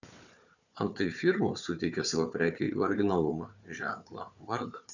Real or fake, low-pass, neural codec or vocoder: fake; 7.2 kHz; codec, 16 kHz, 4 kbps, FunCodec, trained on Chinese and English, 50 frames a second